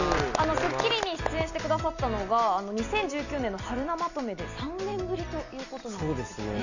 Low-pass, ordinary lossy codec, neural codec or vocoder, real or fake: 7.2 kHz; none; none; real